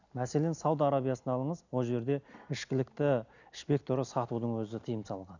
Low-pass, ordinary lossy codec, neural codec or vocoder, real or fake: 7.2 kHz; none; none; real